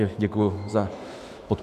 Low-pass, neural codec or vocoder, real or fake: 14.4 kHz; autoencoder, 48 kHz, 128 numbers a frame, DAC-VAE, trained on Japanese speech; fake